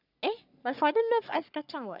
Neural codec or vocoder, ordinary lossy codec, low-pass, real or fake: codec, 44.1 kHz, 3.4 kbps, Pupu-Codec; none; 5.4 kHz; fake